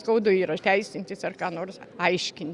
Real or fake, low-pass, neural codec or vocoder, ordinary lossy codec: real; 10.8 kHz; none; Opus, 64 kbps